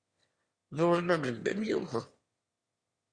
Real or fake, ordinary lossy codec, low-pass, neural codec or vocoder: fake; Opus, 64 kbps; 9.9 kHz; autoencoder, 22.05 kHz, a latent of 192 numbers a frame, VITS, trained on one speaker